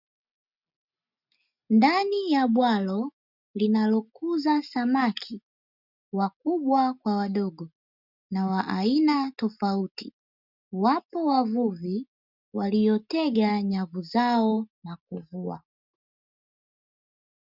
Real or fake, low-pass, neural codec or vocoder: real; 5.4 kHz; none